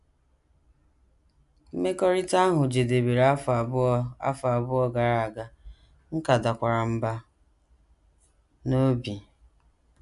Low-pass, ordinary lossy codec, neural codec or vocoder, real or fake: 10.8 kHz; none; none; real